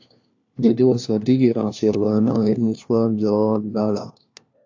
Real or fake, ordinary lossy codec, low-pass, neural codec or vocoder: fake; AAC, 48 kbps; 7.2 kHz; codec, 16 kHz, 1 kbps, FunCodec, trained on LibriTTS, 50 frames a second